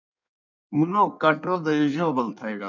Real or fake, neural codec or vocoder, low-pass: fake; codec, 16 kHz in and 24 kHz out, 1.1 kbps, FireRedTTS-2 codec; 7.2 kHz